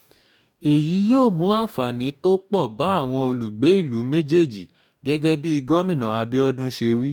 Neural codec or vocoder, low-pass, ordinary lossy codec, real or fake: codec, 44.1 kHz, 2.6 kbps, DAC; 19.8 kHz; none; fake